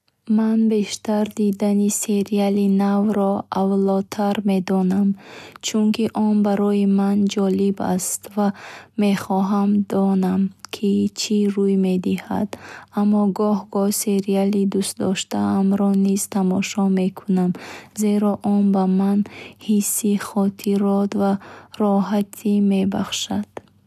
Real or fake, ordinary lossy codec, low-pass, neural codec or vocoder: real; none; 14.4 kHz; none